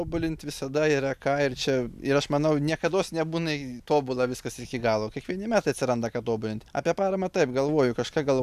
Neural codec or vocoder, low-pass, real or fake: none; 14.4 kHz; real